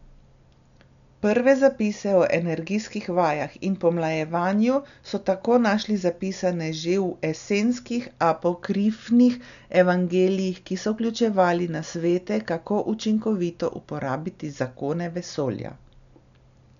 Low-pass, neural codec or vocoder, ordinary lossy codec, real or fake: 7.2 kHz; none; none; real